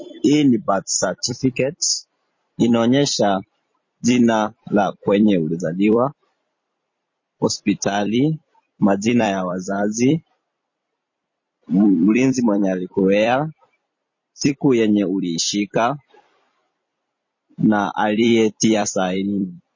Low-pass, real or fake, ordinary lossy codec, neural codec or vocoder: 7.2 kHz; fake; MP3, 32 kbps; vocoder, 44.1 kHz, 128 mel bands every 256 samples, BigVGAN v2